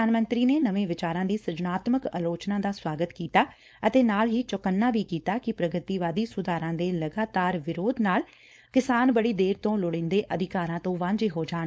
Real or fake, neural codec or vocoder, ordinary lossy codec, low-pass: fake; codec, 16 kHz, 4.8 kbps, FACodec; none; none